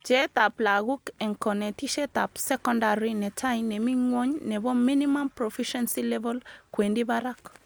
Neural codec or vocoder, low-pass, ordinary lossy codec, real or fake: none; none; none; real